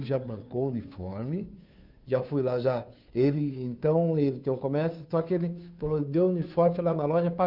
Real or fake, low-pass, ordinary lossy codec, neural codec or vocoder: fake; 5.4 kHz; none; codec, 16 kHz, 2 kbps, FunCodec, trained on Chinese and English, 25 frames a second